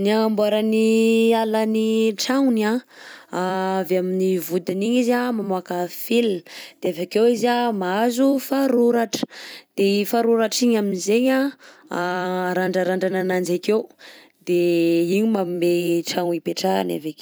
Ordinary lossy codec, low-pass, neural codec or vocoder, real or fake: none; none; vocoder, 44.1 kHz, 128 mel bands every 256 samples, BigVGAN v2; fake